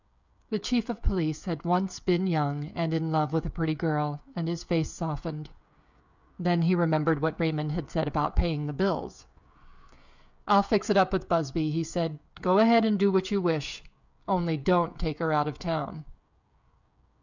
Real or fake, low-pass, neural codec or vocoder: fake; 7.2 kHz; codec, 16 kHz, 16 kbps, FreqCodec, smaller model